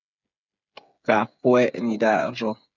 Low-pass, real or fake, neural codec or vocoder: 7.2 kHz; fake; codec, 16 kHz, 8 kbps, FreqCodec, smaller model